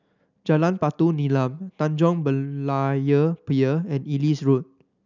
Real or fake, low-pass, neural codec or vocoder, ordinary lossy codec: real; 7.2 kHz; none; none